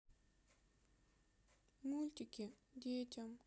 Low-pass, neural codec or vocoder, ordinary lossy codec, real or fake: none; none; none; real